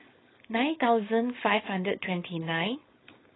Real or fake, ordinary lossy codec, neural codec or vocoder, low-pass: fake; AAC, 16 kbps; codec, 16 kHz, 4.8 kbps, FACodec; 7.2 kHz